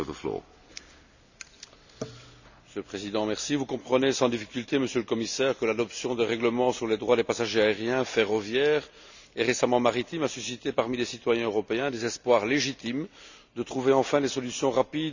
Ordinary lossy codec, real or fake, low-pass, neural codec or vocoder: none; real; 7.2 kHz; none